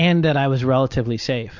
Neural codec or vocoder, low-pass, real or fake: none; 7.2 kHz; real